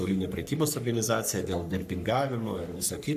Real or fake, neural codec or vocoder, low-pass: fake; codec, 44.1 kHz, 3.4 kbps, Pupu-Codec; 14.4 kHz